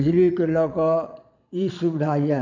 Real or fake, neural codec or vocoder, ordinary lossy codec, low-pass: fake; vocoder, 22.05 kHz, 80 mel bands, Vocos; none; 7.2 kHz